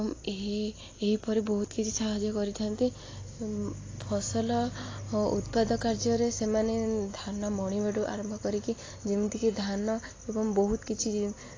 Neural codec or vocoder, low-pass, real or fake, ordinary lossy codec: none; 7.2 kHz; real; AAC, 32 kbps